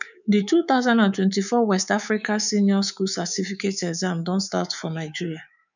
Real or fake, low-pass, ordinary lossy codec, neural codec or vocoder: fake; 7.2 kHz; none; codec, 24 kHz, 3.1 kbps, DualCodec